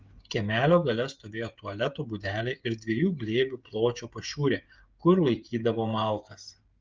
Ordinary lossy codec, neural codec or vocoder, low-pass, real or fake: Opus, 32 kbps; codec, 16 kHz, 16 kbps, FreqCodec, smaller model; 7.2 kHz; fake